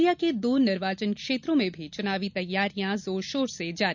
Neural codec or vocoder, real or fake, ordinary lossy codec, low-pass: none; real; none; 7.2 kHz